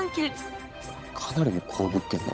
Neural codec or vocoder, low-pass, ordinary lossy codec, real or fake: codec, 16 kHz, 8 kbps, FunCodec, trained on Chinese and English, 25 frames a second; none; none; fake